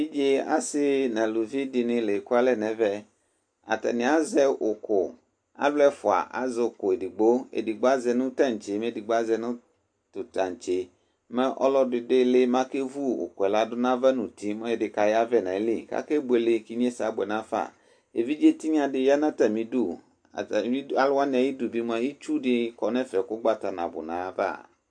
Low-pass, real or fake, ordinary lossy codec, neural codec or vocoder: 9.9 kHz; real; AAC, 64 kbps; none